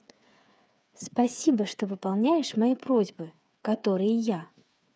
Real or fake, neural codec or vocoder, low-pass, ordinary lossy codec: fake; codec, 16 kHz, 8 kbps, FreqCodec, smaller model; none; none